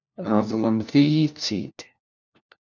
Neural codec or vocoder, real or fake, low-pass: codec, 16 kHz, 1 kbps, FunCodec, trained on LibriTTS, 50 frames a second; fake; 7.2 kHz